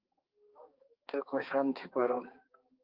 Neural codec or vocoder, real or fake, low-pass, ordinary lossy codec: codec, 16 kHz, 2 kbps, X-Codec, HuBERT features, trained on balanced general audio; fake; 5.4 kHz; Opus, 24 kbps